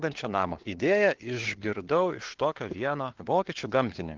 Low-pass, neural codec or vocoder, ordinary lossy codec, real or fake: 7.2 kHz; codec, 16 kHz, 4 kbps, FunCodec, trained on LibriTTS, 50 frames a second; Opus, 16 kbps; fake